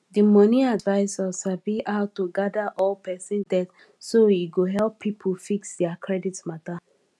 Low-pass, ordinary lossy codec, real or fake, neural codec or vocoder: none; none; real; none